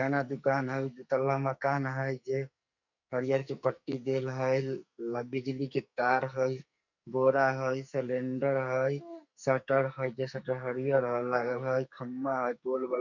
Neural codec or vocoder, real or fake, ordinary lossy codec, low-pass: codec, 44.1 kHz, 2.6 kbps, SNAC; fake; none; 7.2 kHz